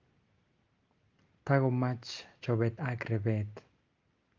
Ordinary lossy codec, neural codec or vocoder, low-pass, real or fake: Opus, 24 kbps; none; 7.2 kHz; real